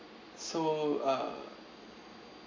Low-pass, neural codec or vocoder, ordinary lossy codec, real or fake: 7.2 kHz; none; MP3, 64 kbps; real